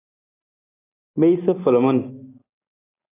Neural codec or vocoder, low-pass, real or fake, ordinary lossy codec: none; 3.6 kHz; real; AAC, 16 kbps